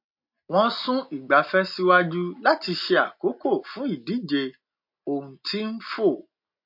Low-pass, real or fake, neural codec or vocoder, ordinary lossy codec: 5.4 kHz; real; none; MP3, 32 kbps